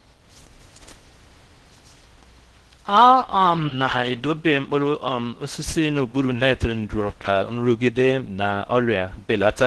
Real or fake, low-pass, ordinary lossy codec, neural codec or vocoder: fake; 10.8 kHz; Opus, 16 kbps; codec, 16 kHz in and 24 kHz out, 0.6 kbps, FocalCodec, streaming, 4096 codes